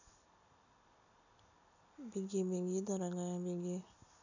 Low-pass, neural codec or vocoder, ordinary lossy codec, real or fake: 7.2 kHz; none; none; real